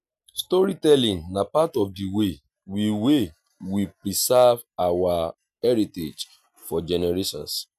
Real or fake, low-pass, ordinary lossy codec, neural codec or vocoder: real; 14.4 kHz; none; none